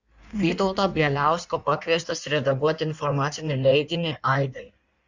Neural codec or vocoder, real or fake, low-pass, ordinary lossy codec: codec, 16 kHz in and 24 kHz out, 1.1 kbps, FireRedTTS-2 codec; fake; 7.2 kHz; Opus, 64 kbps